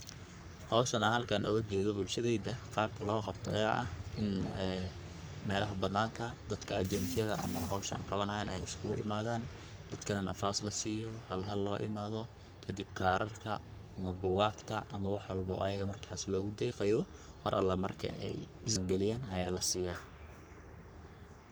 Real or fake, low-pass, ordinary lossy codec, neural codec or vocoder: fake; none; none; codec, 44.1 kHz, 3.4 kbps, Pupu-Codec